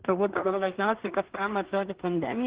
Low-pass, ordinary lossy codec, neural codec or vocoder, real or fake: 3.6 kHz; Opus, 16 kbps; codec, 16 kHz in and 24 kHz out, 0.4 kbps, LongCat-Audio-Codec, two codebook decoder; fake